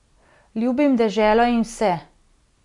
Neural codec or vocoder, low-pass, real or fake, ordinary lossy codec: none; 10.8 kHz; real; none